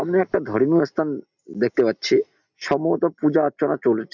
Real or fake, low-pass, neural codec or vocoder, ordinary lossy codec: real; 7.2 kHz; none; none